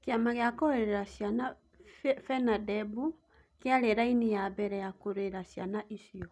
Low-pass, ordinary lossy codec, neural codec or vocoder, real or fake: none; none; none; real